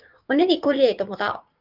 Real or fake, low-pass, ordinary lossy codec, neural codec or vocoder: fake; 5.4 kHz; Opus, 32 kbps; codec, 16 kHz, 6 kbps, DAC